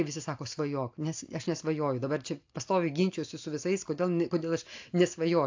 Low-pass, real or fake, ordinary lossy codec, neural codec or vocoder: 7.2 kHz; real; AAC, 48 kbps; none